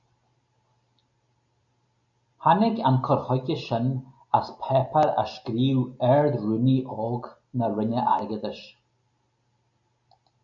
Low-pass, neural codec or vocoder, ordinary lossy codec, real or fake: 7.2 kHz; none; AAC, 64 kbps; real